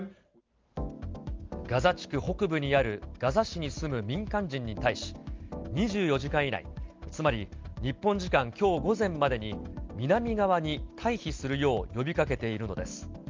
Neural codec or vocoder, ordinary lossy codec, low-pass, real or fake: none; Opus, 32 kbps; 7.2 kHz; real